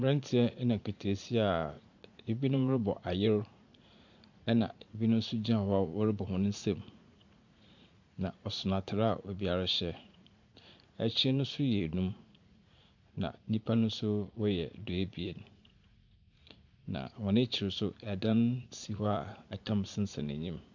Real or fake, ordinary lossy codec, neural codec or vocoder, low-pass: fake; MP3, 64 kbps; vocoder, 44.1 kHz, 80 mel bands, Vocos; 7.2 kHz